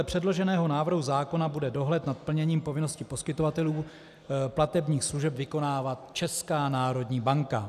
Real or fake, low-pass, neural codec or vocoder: real; 14.4 kHz; none